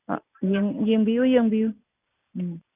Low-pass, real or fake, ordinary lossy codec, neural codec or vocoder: 3.6 kHz; real; none; none